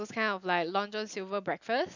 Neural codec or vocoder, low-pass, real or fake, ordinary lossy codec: none; 7.2 kHz; real; none